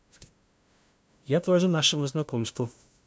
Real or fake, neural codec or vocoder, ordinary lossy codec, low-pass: fake; codec, 16 kHz, 0.5 kbps, FunCodec, trained on LibriTTS, 25 frames a second; none; none